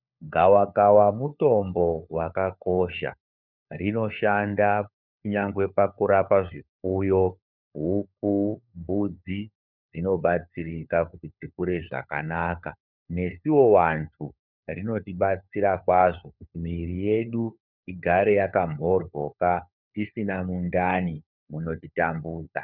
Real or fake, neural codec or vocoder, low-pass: fake; codec, 16 kHz, 4 kbps, FunCodec, trained on LibriTTS, 50 frames a second; 5.4 kHz